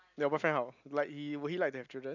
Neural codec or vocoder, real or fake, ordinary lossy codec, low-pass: none; real; none; 7.2 kHz